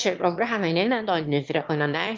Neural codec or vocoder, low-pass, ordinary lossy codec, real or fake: autoencoder, 22.05 kHz, a latent of 192 numbers a frame, VITS, trained on one speaker; 7.2 kHz; Opus, 32 kbps; fake